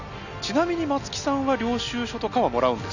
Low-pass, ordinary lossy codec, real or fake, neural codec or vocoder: 7.2 kHz; none; real; none